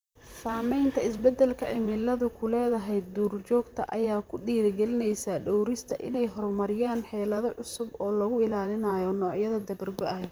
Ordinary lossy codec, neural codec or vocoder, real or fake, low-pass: none; vocoder, 44.1 kHz, 128 mel bands, Pupu-Vocoder; fake; none